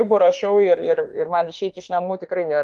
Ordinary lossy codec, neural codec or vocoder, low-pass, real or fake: Opus, 24 kbps; autoencoder, 48 kHz, 32 numbers a frame, DAC-VAE, trained on Japanese speech; 10.8 kHz; fake